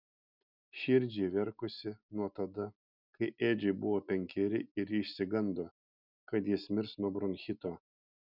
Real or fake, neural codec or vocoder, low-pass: real; none; 5.4 kHz